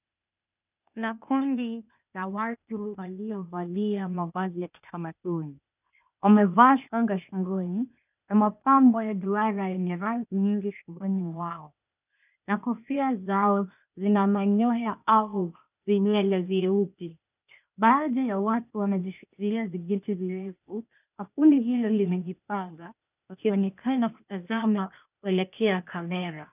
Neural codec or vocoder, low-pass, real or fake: codec, 16 kHz, 0.8 kbps, ZipCodec; 3.6 kHz; fake